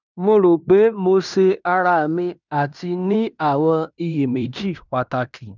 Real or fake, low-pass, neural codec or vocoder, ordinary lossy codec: fake; 7.2 kHz; codec, 16 kHz in and 24 kHz out, 0.9 kbps, LongCat-Audio-Codec, fine tuned four codebook decoder; none